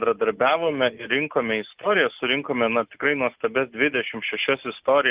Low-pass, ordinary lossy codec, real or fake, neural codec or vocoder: 3.6 kHz; Opus, 32 kbps; real; none